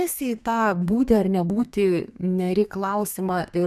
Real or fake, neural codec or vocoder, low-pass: fake; codec, 32 kHz, 1.9 kbps, SNAC; 14.4 kHz